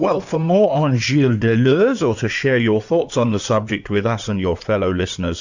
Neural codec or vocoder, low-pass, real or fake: codec, 16 kHz in and 24 kHz out, 2.2 kbps, FireRedTTS-2 codec; 7.2 kHz; fake